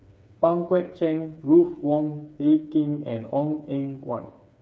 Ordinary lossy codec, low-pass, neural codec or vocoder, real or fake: none; none; codec, 16 kHz, 4 kbps, FreqCodec, smaller model; fake